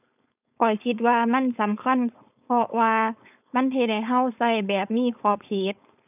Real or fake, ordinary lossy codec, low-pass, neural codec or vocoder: fake; none; 3.6 kHz; codec, 16 kHz, 4.8 kbps, FACodec